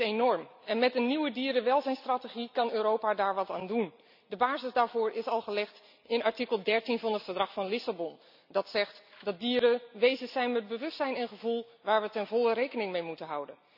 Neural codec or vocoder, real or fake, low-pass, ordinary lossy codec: none; real; 5.4 kHz; none